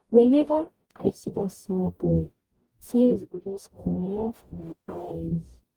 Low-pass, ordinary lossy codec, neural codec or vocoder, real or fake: 19.8 kHz; Opus, 32 kbps; codec, 44.1 kHz, 0.9 kbps, DAC; fake